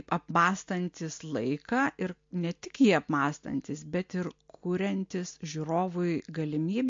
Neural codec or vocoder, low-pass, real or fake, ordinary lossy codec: none; 7.2 kHz; real; MP3, 48 kbps